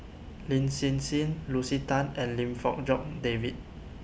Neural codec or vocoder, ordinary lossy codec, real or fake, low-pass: none; none; real; none